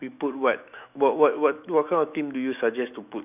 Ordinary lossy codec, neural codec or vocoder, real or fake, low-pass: none; autoencoder, 48 kHz, 128 numbers a frame, DAC-VAE, trained on Japanese speech; fake; 3.6 kHz